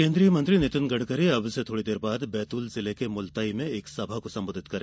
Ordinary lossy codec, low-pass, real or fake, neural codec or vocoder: none; none; real; none